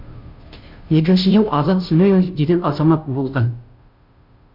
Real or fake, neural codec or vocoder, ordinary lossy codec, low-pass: fake; codec, 16 kHz, 0.5 kbps, FunCodec, trained on Chinese and English, 25 frames a second; MP3, 48 kbps; 5.4 kHz